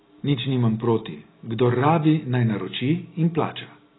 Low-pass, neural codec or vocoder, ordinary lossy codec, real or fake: 7.2 kHz; none; AAC, 16 kbps; real